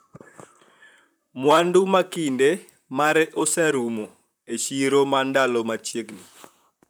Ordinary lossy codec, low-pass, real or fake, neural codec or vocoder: none; none; fake; vocoder, 44.1 kHz, 128 mel bands, Pupu-Vocoder